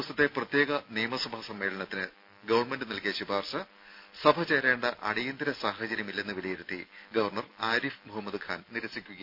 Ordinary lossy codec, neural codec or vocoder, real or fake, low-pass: none; none; real; 5.4 kHz